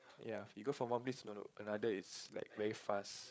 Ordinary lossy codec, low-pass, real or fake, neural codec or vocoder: none; none; fake; codec, 16 kHz, 8 kbps, FreqCodec, larger model